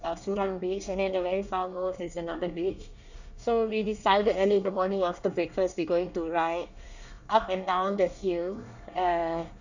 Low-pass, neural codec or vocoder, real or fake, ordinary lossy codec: 7.2 kHz; codec, 24 kHz, 1 kbps, SNAC; fake; none